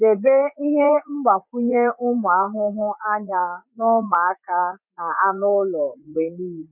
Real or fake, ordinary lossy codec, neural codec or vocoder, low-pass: fake; none; vocoder, 44.1 kHz, 80 mel bands, Vocos; 3.6 kHz